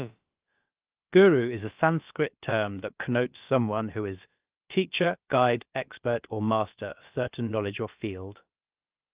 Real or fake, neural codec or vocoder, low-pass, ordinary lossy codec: fake; codec, 16 kHz, about 1 kbps, DyCAST, with the encoder's durations; 3.6 kHz; Opus, 32 kbps